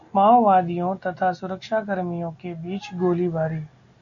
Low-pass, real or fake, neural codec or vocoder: 7.2 kHz; real; none